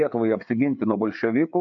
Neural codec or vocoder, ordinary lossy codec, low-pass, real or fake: codec, 16 kHz, 4 kbps, FreqCodec, larger model; AAC, 64 kbps; 7.2 kHz; fake